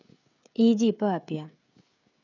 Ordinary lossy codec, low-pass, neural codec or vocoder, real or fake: none; 7.2 kHz; codec, 16 kHz, 16 kbps, FreqCodec, smaller model; fake